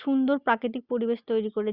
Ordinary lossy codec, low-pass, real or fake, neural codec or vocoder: Opus, 64 kbps; 5.4 kHz; real; none